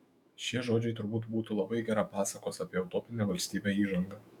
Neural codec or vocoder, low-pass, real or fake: autoencoder, 48 kHz, 128 numbers a frame, DAC-VAE, trained on Japanese speech; 19.8 kHz; fake